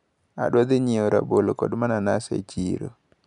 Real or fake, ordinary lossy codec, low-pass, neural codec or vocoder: real; none; 10.8 kHz; none